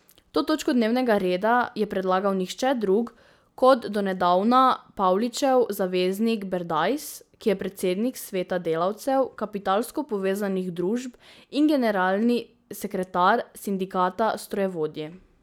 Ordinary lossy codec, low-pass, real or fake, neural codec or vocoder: none; none; real; none